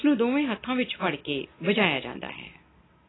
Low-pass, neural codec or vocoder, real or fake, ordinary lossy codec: 7.2 kHz; none; real; AAC, 16 kbps